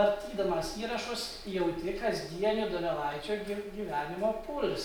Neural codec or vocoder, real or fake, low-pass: none; real; 19.8 kHz